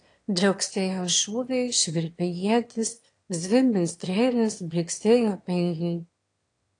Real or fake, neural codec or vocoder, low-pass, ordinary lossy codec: fake; autoencoder, 22.05 kHz, a latent of 192 numbers a frame, VITS, trained on one speaker; 9.9 kHz; AAC, 48 kbps